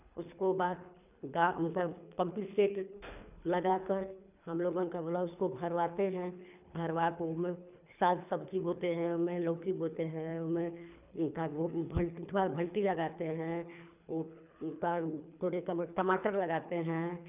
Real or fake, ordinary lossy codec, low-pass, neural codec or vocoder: fake; none; 3.6 kHz; codec, 24 kHz, 3 kbps, HILCodec